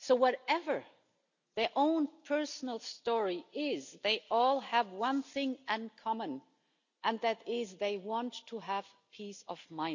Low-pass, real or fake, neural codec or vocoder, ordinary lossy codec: 7.2 kHz; real; none; none